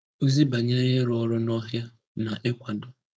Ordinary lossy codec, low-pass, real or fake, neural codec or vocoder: none; none; fake; codec, 16 kHz, 4.8 kbps, FACodec